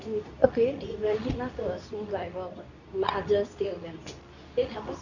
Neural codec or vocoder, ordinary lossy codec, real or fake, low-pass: codec, 24 kHz, 0.9 kbps, WavTokenizer, medium speech release version 2; none; fake; 7.2 kHz